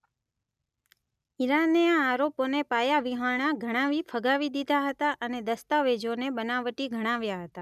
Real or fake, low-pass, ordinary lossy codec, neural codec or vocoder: real; 14.4 kHz; none; none